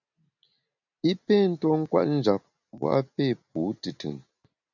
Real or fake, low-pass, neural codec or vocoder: real; 7.2 kHz; none